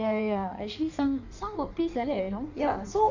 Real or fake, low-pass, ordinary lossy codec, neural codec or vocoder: fake; 7.2 kHz; none; codec, 16 kHz in and 24 kHz out, 1.1 kbps, FireRedTTS-2 codec